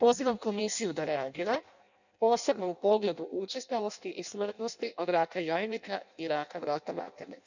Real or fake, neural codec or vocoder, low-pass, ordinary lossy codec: fake; codec, 16 kHz in and 24 kHz out, 0.6 kbps, FireRedTTS-2 codec; 7.2 kHz; none